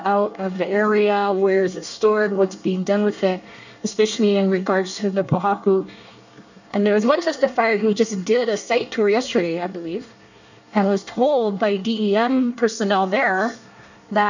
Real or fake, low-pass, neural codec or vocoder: fake; 7.2 kHz; codec, 24 kHz, 1 kbps, SNAC